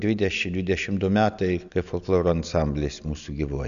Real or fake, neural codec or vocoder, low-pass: real; none; 7.2 kHz